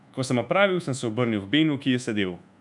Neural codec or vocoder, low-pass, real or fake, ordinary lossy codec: codec, 24 kHz, 1.2 kbps, DualCodec; 10.8 kHz; fake; none